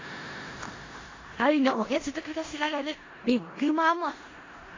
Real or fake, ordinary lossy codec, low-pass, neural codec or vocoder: fake; AAC, 32 kbps; 7.2 kHz; codec, 16 kHz in and 24 kHz out, 0.4 kbps, LongCat-Audio-Codec, four codebook decoder